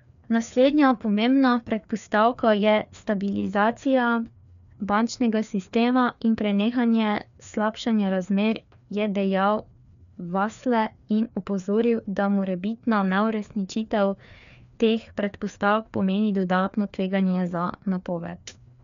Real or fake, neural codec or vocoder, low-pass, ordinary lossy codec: fake; codec, 16 kHz, 2 kbps, FreqCodec, larger model; 7.2 kHz; none